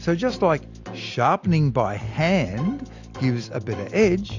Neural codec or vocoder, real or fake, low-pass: none; real; 7.2 kHz